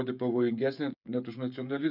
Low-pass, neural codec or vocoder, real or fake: 5.4 kHz; codec, 16 kHz, 16 kbps, FreqCodec, smaller model; fake